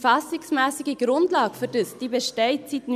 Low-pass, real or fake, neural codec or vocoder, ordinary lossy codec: 14.4 kHz; real; none; none